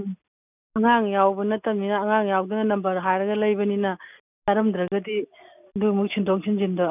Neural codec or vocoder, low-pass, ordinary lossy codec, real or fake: none; 3.6 kHz; none; real